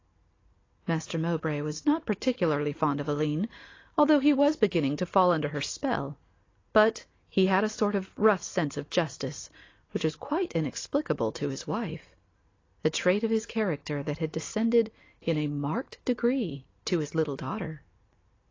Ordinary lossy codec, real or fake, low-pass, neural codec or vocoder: AAC, 32 kbps; real; 7.2 kHz; none